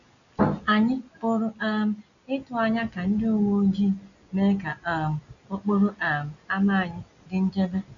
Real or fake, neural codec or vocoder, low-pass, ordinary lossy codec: real; none; 7.2 kHz; none